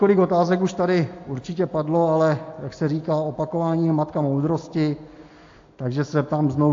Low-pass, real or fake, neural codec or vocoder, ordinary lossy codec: 7.2 kHz; real; none; AAC, 48 kbps